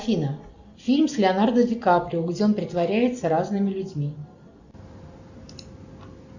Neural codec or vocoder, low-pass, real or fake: none; 7.2 kHz; real